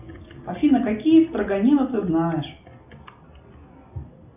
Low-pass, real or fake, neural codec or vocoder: 3.6 kHz; real; none